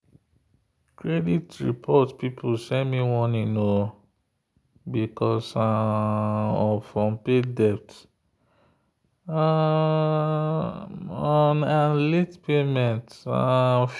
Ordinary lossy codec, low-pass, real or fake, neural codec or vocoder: none; none; real; none